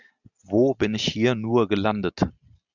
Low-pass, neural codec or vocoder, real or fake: 7.2 kHz; none; real